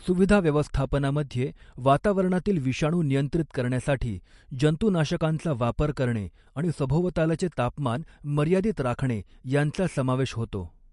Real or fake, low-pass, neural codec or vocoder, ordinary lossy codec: real; 14.4 kHz; none; MP3, 48 kbps